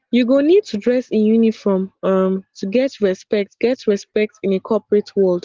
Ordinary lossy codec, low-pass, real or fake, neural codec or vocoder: Opus, 16 kbps; 7.2 kHz; real; none